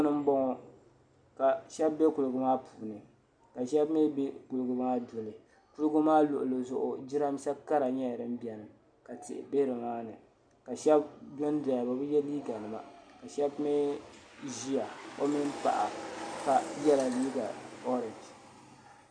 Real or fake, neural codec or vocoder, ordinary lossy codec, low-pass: real; none; MP3, 96 kbps; 9.9 kHz